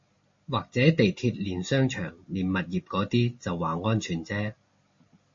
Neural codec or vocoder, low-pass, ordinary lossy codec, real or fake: none; 7.2 kHz; MP3, 32 kbps; real